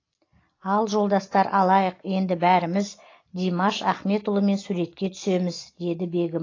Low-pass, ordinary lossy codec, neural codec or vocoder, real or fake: 7.2 kHz; AAC, 32 kbps; none; real